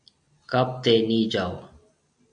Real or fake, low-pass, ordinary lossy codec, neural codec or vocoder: real; 9.9 kHz; AAC, 64 kbps; none